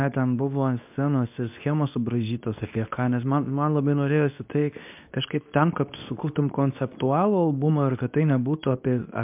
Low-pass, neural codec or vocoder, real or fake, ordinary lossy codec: 3.6 kHz; codec, 24 kHz, 0.9 kbps, WavTokenizer, medium speech release version 2; fake; MP3, 32 kbps